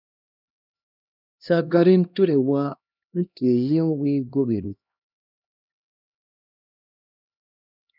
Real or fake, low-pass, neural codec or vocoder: fake; 5.4 kHz; codec, 16 kHz, 1 kbps, X-Codec, HuBERT features, trained on LibriSpeech